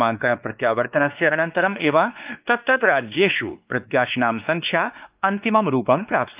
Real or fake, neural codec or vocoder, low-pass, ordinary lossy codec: fake; codec, 16 kHz, 2 kbps, X-Codec, WavLM features, trained on Multilingual LibriSpeech; 3.6 kHz; Opus, 32 kbps